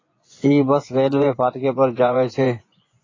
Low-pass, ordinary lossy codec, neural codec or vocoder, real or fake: 7.2 kHz; AAC, 32 kbps; vocoder, 24 kHz, 100 mel bands, Vocos; fake